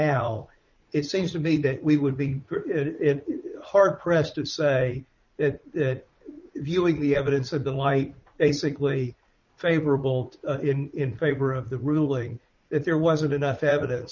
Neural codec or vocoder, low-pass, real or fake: vocoder, 44.1 kHz, 80 mel bands, Vocos; 7.2 kHz; fake